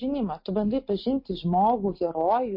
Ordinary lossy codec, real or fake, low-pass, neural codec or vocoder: MP3, 32 kbps; real; 5.4 kHz; none